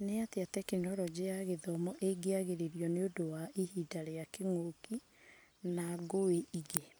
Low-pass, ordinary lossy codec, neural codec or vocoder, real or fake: none; none; none; real